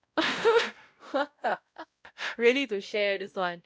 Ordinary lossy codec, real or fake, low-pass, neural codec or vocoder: none; fake; none; codec, 16 kHz, 0.5 kbps, X-Codec, WavLM features, trained on Multilingual LibriSpeech